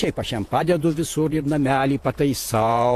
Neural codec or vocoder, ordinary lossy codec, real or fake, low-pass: vocoder, 48 kHz, 128 mel bands, Vocos; AAC, 64 kbps; fake; 14.4 kHz